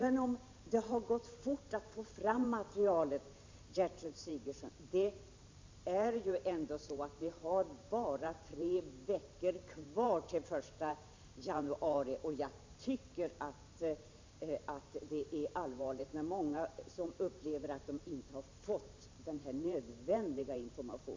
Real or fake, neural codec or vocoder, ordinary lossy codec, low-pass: fake; vocoder, 44.1 kHz, 128 mel bands every 512 samples, BigVGAN v2; MP3, 64 kbps; 7.2 kHz